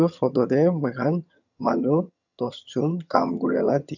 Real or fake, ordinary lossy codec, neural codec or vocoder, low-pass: fake; none; vocoder, 22.05 kHz, 80 mel bands, HiFi-GAN; 7.2 kHz